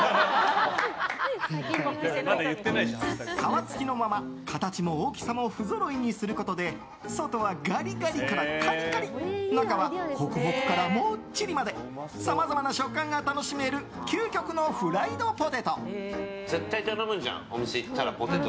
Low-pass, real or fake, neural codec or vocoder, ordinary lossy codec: none; real; none; none